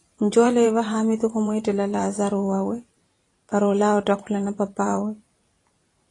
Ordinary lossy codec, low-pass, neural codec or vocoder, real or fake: AAC, 32 kbps; 10.8 kHz; vocoder, 44.1 kHz, 128 mel bands every 512 samples, BigVGAN v2; fake